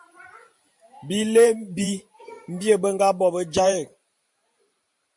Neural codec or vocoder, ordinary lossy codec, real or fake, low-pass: vocoder, 44.1 kHz, 128 mel bands every 512 samples, BigVGAN v2; MP3, 64 kbps; fake; 10.8 kHz